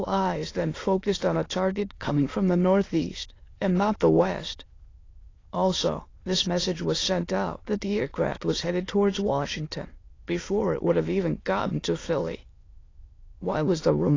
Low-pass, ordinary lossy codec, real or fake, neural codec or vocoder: 7.2 kHz; AAC, 32 kbps; fake; autoencoder, 22.05 kHz, a latent of 192 numbers a frame, VITS, trained on many speakers